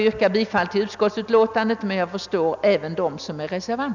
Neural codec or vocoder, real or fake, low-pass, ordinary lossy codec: none; real; 7.2 kHz; none